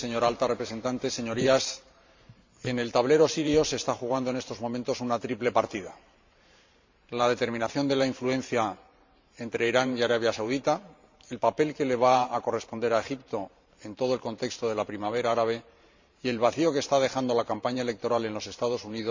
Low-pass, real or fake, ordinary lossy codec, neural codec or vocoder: 7.2 kHz; fake; MP3, 64 kbps; vocoder, 44.1 kHz, 128 mel bands every 512 samples, BigVGAN v2